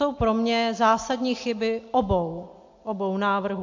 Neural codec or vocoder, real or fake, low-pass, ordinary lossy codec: none; real; 7.2 kHz; AAC, 48 kbps